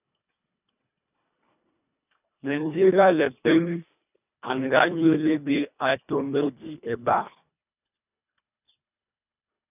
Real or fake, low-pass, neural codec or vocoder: fake; 3.6 kHz; codec, 24 kHz, 1.5 kbps, HILCodec